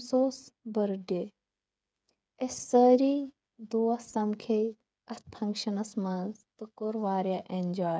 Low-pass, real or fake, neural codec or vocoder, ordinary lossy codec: none; fake; codec, 16 kHz, 8 kbps, FreqCodec, smaller model; none